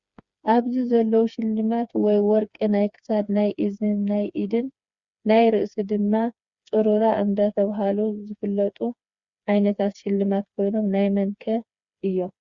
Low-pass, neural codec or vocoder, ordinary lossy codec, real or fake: 7.2 kHz; codec, 16 kHz, 4 kbps, FreqCodec, smaller model; Opus, 64 kbps; fake